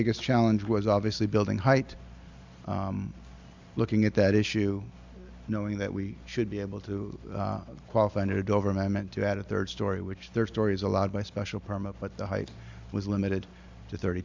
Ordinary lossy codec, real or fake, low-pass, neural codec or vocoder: MP3, 64 kbps; real; 7.2 kHz; none